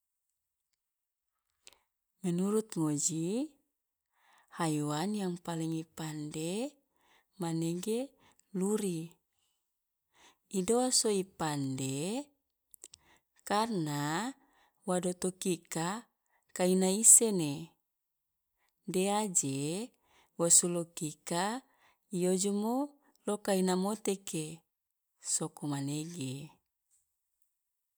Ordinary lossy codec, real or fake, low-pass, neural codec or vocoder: none; real; none; none